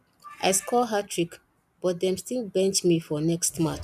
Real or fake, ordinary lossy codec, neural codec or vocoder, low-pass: real; none; none; 14.4 kHz